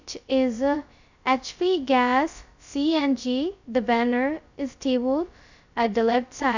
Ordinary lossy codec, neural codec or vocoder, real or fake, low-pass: none; codec, 16 kHz, 0.2 kbps, FocalCodec; fake; 7.2 kHz